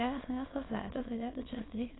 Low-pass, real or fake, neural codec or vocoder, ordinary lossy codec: 7.2 kHz; fake; autoencoder, 22.05 kHz, a latent of 192 numbers a frame, VITS, trained on many speakers; AAC, 16 kbps